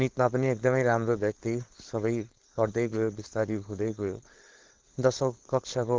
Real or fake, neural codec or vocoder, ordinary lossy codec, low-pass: fake; codec, 16 kHz, 4.8 kbps, FACodec; Opus, 16 kbps; 7.2 kHz